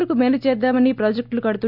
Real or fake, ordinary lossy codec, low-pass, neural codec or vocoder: real; none; 5.4 kHz; none